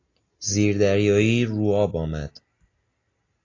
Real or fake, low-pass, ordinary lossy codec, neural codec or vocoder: real; 7.2 kHz; AAC, 32 kbps; none